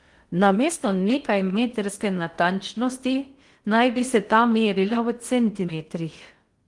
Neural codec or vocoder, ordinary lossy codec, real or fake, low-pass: codec, 16 kHz in and 24 kHz out, 0.6 kbps, FocalCodec, streaming, 4096 codes; Opus, 24 kbps; fake; 10.8 kHz